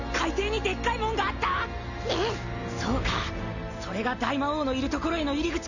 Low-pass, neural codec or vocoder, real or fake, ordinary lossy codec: 7.2 kHz; none; real; none